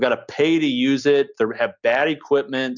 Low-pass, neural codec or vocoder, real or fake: 7.2 kHz; none; real